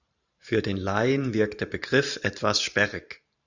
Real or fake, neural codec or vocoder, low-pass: fake; vocoder, 44.1 kHz, 128 mel bands every 512 samples, BigVGAN v2; 7.2 kHz